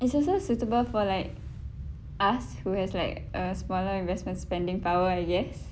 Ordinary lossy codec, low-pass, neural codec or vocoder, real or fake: none; none; none; real